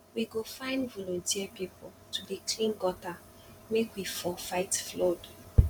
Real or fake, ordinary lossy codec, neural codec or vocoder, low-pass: fake; none; vocoder, 44.1 kHz, 128 mel bands every 512 samples, BigVGAN v2; 19.8 kHz